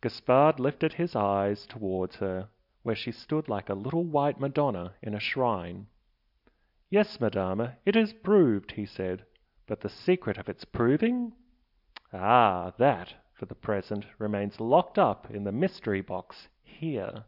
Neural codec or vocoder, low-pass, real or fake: none; 5.4 kHz; real